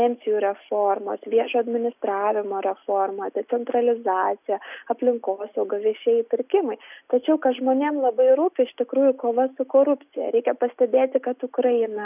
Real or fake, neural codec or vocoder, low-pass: real; none; 3.6 kHz